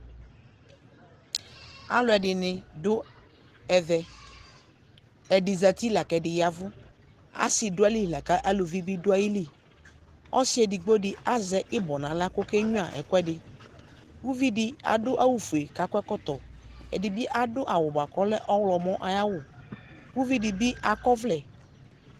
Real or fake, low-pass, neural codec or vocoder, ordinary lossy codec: real; 14.4 kHz; none; Opus, 16 kbps